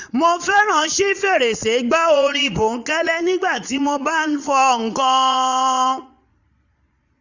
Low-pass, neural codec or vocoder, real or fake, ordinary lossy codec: 7.2 kHz; vocoder, 22.05 kHz, 80 mel bands, Vocos; fake; none